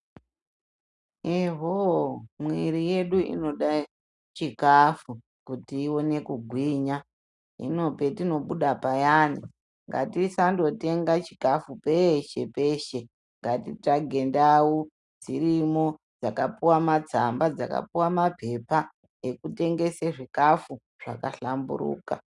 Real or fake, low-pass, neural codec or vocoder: real; 10.8 kHz; none